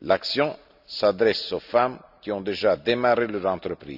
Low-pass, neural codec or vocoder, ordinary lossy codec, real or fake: 5.4 kHz; vocoder, 44.1 kHz, 128 mel bands every 256 samples, BigVGAN v2; none; fake